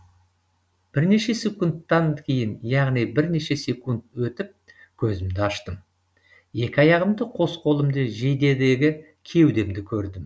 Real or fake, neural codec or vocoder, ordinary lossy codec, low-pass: real; none; none; none